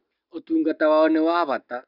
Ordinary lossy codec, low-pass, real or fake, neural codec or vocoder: none; 5.4 kHz; real; none